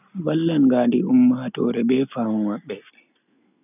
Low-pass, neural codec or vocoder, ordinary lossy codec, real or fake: 3.6 kHz; none; none; real